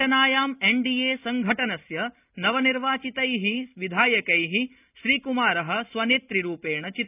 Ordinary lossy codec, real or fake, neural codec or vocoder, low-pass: none; real; none; 3.6 kHz